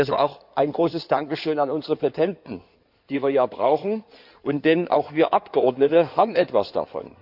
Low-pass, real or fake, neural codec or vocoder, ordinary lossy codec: 5.4 kHz; fake; codec, 16 kHz in and 24 kHz out, 2.2 kbps, FireRedTTS-2 codec; none